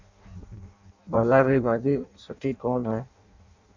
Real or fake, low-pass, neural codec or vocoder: fake; 7.2 kHz; codec, 16 kHz in and 24 kHz out, 0.6 kbps, FireRedTTS-2 codec